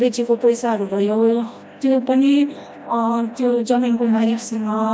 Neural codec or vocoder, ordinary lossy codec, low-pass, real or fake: codec, 16 kHz, 1 kbps, FreqCodec, smaller model; none; none; fake